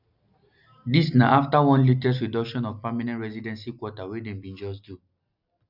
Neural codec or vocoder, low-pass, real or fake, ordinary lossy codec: none; 5.4 kHz; real; none